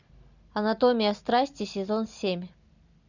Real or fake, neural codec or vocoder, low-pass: real; none; 7.2 kHz